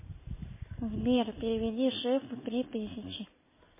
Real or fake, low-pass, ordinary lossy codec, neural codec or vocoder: real; 3.6 kHz; MP3, 16 kbps; none